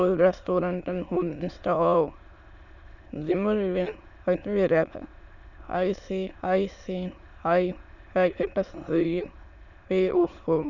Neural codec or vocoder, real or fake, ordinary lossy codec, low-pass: autoencoder, 22.05 kHz, a latent of 192 numbers a frame, VITS, trained on many speakers; fake; none; 7.2 kHz